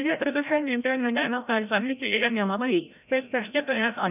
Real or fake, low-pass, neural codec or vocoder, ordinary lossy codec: fake; 3.6 kHz; codec, 16 kHz, 0.5 kbps, FreqCodec, larger model; none